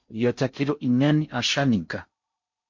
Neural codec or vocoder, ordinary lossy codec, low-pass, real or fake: codec, 16 kHz in and 24 kHz out, 0.6 kbps, FocalCodec, streaming, 4096 codes; MP3, 48 kbps; 7.2 kHz; fake